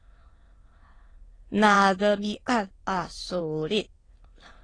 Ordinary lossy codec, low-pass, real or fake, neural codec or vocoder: AAC, 32 kbps; 9.9 kHz; fake; autoencoder, 22.05 kHz, a latent of 192 numbers a frame, VITS, trained on many speakers